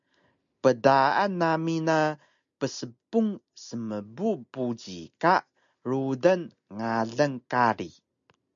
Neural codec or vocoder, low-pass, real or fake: none; 7.2 kHz; real